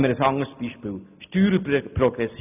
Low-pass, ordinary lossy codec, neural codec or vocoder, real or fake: 3.6 kHz; none; none; real